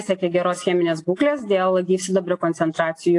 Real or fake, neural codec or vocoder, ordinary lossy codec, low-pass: real; none; AAC, 48 kbps; 10.8 kHz